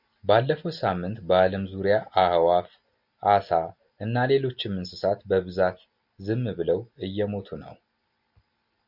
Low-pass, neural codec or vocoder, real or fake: 5.4 kHz; none; real